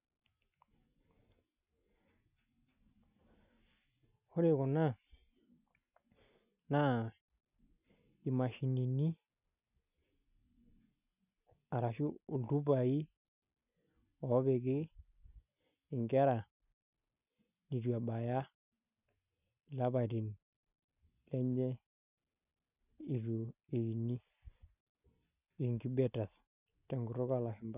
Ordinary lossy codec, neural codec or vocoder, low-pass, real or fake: none; none; 3.6 kHz; real